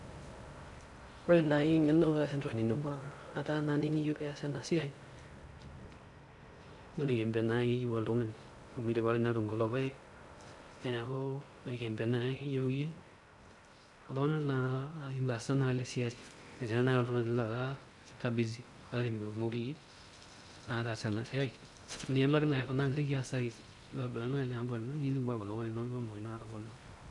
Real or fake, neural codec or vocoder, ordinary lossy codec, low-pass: fake; codec, 16 kHz in and 24 kHz out, 0.6 kbps, FocalCodec, streaming, 2048 codes; none; 10.8 kHz